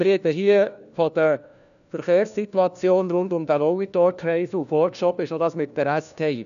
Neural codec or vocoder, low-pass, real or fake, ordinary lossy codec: codec, 16 kHz, 1 kbps, FunCodec, trained on LibriTTS, 50 frames a second; 7.2 kHz; fake; none